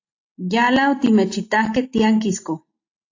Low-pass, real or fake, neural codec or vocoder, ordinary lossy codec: 7.2 kHz; real; none; AAC, 48 kbps